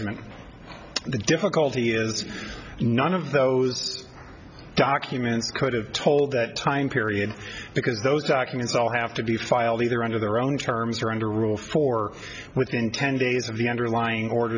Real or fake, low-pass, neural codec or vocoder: real; 7.2 kHz; none